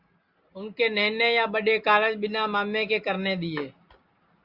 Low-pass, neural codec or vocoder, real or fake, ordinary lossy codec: 5.4 kHz; none; real; Opus, 64 kbps